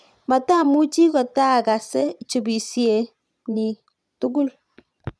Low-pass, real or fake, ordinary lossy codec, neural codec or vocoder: none; fake; none; vocoder, 22.05 kHz, 80 mel bands, Vocos